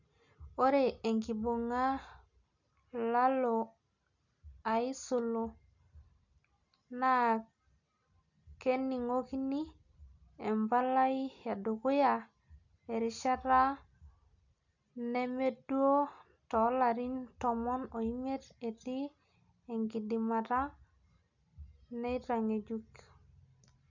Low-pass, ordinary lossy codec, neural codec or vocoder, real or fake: 7.2 kHz; none; none; real